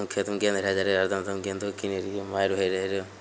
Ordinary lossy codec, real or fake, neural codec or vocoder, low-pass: none; real; none; none